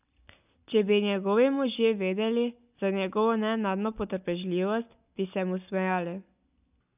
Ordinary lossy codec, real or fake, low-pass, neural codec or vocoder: none; real; 3.6 kHz; none